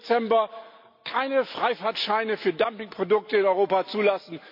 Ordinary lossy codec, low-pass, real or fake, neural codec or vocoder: MP3, 48 kbps; 5.4 kHz; real; none